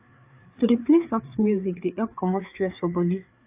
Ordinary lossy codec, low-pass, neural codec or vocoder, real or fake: AAC, 32 kbps; 3.6 kHz; codec, 16 kHz, 4 kbps, FreqCodec, larger model; fake